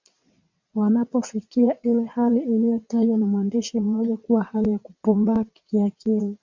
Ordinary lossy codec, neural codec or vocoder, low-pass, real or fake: MP3, 64 kbps; vocoder, 22.05 kHz, 80 mel bands, WaveNeXt; 7.2 kHz; fake